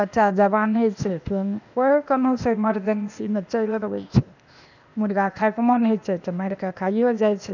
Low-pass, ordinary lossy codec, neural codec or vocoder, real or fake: 7.2 kHz; none; codec, 16 kHz, 0.8 kbps, ZipCodec; fake